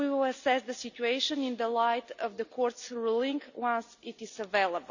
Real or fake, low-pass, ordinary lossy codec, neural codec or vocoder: real; 7.2 kHz; none; none